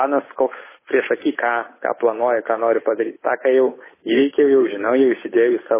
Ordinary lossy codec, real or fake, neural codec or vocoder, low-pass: MP3, 16 kbps; fake; codec, 16 kHz, 8 kbps, FunCodec, trained on Chinese and English, 25 frames a second; 3.6 kHz